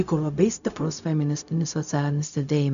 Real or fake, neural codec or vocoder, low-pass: fake; codec, 16 kHz, 0.4 kbps, LongCat-Audio-Codec; 7.2 kHz